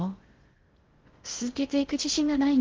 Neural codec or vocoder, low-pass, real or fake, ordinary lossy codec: codec, 16 kHz in and 24 kHz out, 0.6 kbps, FocalCodec, streaming, 2048 codes; 7.2 kHz; fake; Opus, 16 kbps